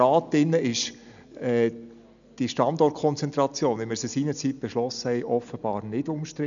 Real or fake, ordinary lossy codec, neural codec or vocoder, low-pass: real; none; none; 7.2 kHz